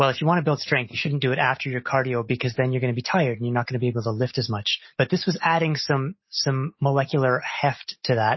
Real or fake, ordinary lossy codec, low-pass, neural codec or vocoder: real; MP3, 24 kbps; 7.2 kHz; none